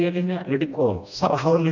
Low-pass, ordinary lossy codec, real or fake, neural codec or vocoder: 7.2 kHz; none; fake; codec, 16 kHz, 1 kbps, FreqCodec, smaller model